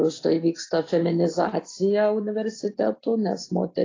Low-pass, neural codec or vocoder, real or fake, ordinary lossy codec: 7.2 kHz; none; real; AAC, 32 kbps